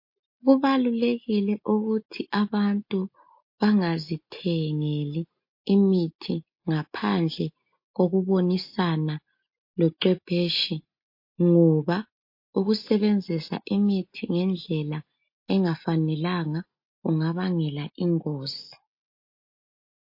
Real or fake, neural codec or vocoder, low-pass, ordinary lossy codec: real; none; 5.4 kHz; MP3, 32 kbps